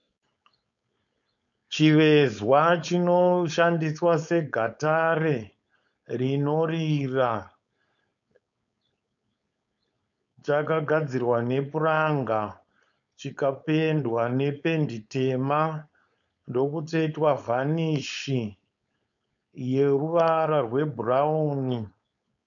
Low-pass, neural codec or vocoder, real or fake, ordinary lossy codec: 7.2 kHz; codec, 16 kHz, 4.8 kbps, FACodec; fake; MP3, 96 kbps